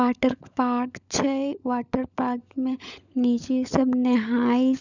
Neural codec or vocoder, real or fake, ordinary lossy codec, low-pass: codec, 16 kHz, 16 kbps, FunCodec, trained on LibriTTS, 50 frames a second; fake; none; 7.2 kHz